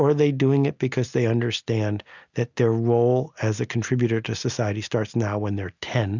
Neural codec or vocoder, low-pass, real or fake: none; 7.2 kHz; real